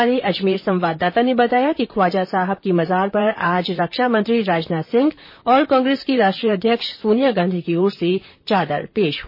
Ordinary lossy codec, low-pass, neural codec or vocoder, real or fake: MP3, 24 kbps; 5.4 kHz; vocoder, 44.1 kHz, 128 mel bands, Pupu-Vocoder; fake